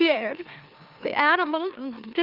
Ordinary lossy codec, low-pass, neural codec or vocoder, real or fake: Opus, 24 kbps; 5.4 kHz; autoencoder, 44.1 kHz, a latent of 192 numbers a frame, MeloTTS; fake